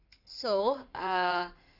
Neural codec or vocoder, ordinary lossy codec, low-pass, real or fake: codec, 16 kHz in and 24 kHz out, 2.2 kbps, FireRedTTS-2 codec; none; 5.4 kHz; fake